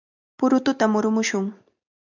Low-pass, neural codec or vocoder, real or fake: 7.2 kHz; none; real